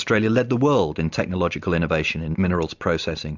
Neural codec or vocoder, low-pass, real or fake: none; 7.2 kHz; real